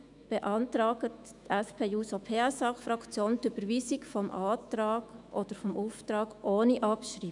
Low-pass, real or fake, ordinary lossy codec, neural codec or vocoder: 10.8 kHz; fake; none; autoencoder, 48 kHz, 128 numbers a frame, DAC-VAE, trained on Japanese speech